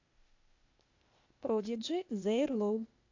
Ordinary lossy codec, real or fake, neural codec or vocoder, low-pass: AAC, 48 kbps; fake; codec, 16 kHz, 0.8 kbps, ZipCodec; 7.2 kHz